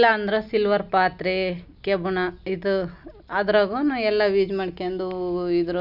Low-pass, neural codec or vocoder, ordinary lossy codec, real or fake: 5.4 kHz; none; none; real